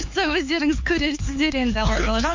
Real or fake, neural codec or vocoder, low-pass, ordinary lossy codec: fake; codec, 16 kHz, 4 kbps, X-Codec, HuBERT features, trained on LibriSpeech; 7.2 kHz; MP3, 48 kbps